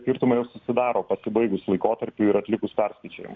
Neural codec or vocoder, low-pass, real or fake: none; 7.2 kHz; real